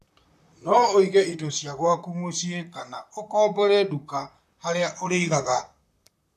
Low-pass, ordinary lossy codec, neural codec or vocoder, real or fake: 14.4 kHz; AAC, 96 kbps; vocoder, 44.1 kHz, 128 mel bands, Pupu-Vocoder; fake